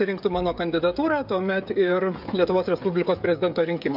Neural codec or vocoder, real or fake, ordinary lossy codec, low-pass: codec, 16 kHz, 16 kbps, FreqCodec, smaller model; fake; AAC, 48 kbps; 5.4 kHz